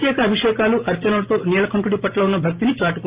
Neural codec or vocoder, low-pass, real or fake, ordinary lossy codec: none; 3.6 kHz; real; Opus, 32 kbps